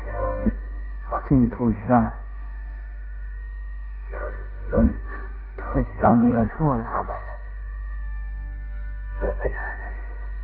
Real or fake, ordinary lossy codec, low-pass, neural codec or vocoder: fake; none; 5.4 kHz; codec, 16 kHz in and 24 kHz out, 0.9 kbps, LongCat-Audio-Codec, four codebook decoder